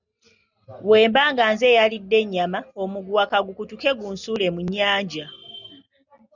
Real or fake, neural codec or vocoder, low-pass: real; none; 7.2 kHz